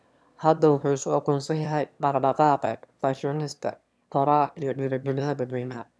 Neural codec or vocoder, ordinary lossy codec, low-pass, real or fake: autoencoder, 22.05 kHz, a latent of 192 numbers a frame, VITS, trained on one speaker; none; none; fake